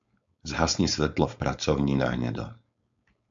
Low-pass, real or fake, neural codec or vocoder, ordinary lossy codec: 7.2 kHz; fake; codec, 16 kHz, 4.8 kbps, FACodec; MP3, 64 kbps